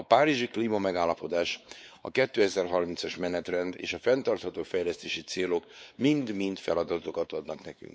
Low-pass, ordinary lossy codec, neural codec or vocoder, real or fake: none; none; codec, 16 kHz, 4 kbps, X-Codec, WavLM features, trained on Multilingual LibriSpeech; fake